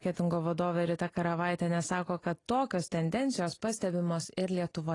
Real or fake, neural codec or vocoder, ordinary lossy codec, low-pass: real; none; AAC, 32 kbps; 10.8 kHz